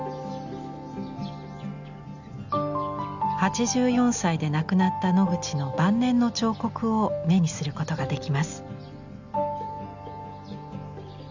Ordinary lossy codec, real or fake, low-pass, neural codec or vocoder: none; real; 7.2 kHz; none